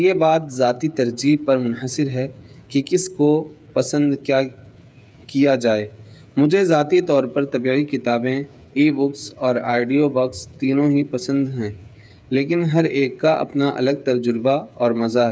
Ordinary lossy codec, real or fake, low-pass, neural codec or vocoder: none; fake; none; codec, 16 kHz, 8 kbps, FreqCodec, smaller model